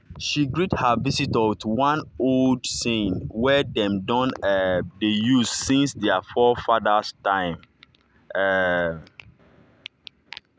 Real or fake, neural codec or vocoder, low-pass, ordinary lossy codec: real; none; none; none